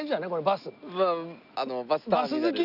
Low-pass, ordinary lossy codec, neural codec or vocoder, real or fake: 5.4 kHz; AAC, 48 kbps; none; real